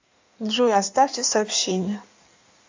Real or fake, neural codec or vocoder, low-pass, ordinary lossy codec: fake; codec, 16 kHz in and 24 kHz out, 1.1 kbps, FireRedTTS-2 codec; 7.2 kHz; AAC, 48 kbps